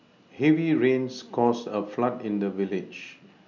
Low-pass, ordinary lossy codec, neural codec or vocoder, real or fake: 7.2 kHz; none; none; real